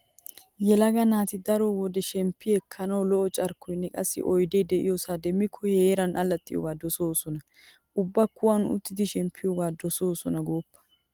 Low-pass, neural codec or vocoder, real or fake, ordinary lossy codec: 19.8 kHz; none; real; Opus, 24 kbps